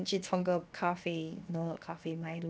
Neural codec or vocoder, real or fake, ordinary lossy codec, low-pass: codec, 16 kHz, 0.7 kbps, FocalCodec; fake; none; none